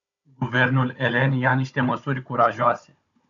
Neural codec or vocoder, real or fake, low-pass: codec, 16 kHz, 16 kbps, FunCodec, trained on Chinese and English, 50 frames a second; fake; 7.2 kHz